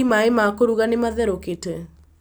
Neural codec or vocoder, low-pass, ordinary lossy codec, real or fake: none; none; none; real